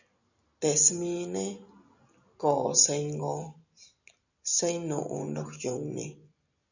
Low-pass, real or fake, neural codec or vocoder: 7.2 kHz; real; none